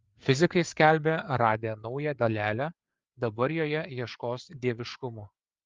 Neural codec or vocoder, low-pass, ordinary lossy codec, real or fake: codec, 16 kHz, 4 kbps, FreqCodec, larger model; 7.2 kHz; Opus, 32 kbps; fake